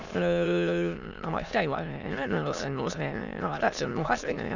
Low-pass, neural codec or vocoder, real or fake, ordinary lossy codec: 7.2 kHz; autoencoder, 22.05 kHz, a latent of 192 numbers a frame, VITS, trained on many speakers; fake; none